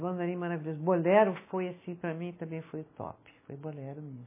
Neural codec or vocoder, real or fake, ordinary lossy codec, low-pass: none; real; MP3, 16 kbps; 3.6 kHz